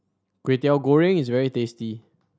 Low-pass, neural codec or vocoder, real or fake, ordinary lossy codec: none; none; real; none